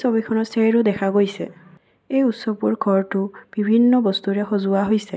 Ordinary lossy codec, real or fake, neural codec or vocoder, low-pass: none; real; none; none